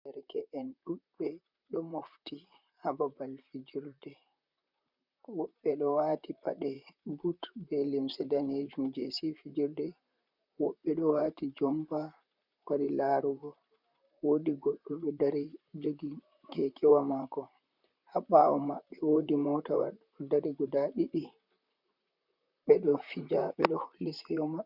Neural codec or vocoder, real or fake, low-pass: vocoder, 44.1 kHz, 128 mel bands, Pupu-Vocoder; fake; 5.4 kHz